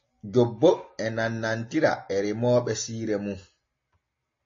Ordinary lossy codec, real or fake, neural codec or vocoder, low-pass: MP3, 32 kbps; real; none; 7.2 kHz